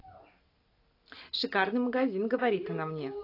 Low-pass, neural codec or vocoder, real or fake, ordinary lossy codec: 5.4 kHz; none; real; AAC, 32 kbps